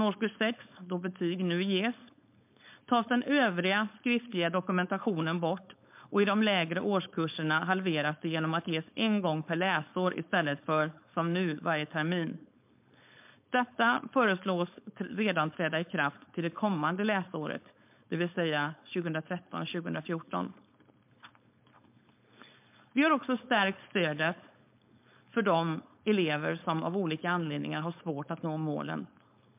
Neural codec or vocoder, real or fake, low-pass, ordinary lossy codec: codec, 16 kHz, 4.8 kbps, FACodec; fake; 3.6 kHz; MP3, 32 kbps